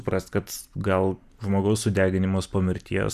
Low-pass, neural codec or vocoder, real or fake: 14.4 kHz; none; real